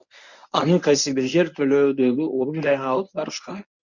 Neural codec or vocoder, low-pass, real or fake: codec, 24 kHz, 0.9 kbps, WavTokenizer, medium speech release version 1; 7.2 kHz; fake